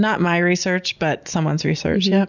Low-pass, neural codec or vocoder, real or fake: 7.2 kHz; none; real